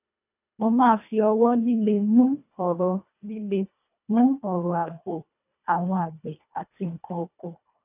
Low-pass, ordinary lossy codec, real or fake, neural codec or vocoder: 3.6 kHz; none; fake; codec, 24 kHz, 1.5 kbps, HILCodec